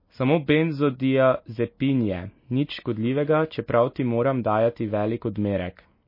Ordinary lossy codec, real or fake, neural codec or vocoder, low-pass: MP3, 24 kbps; real; none; 5.4 kHz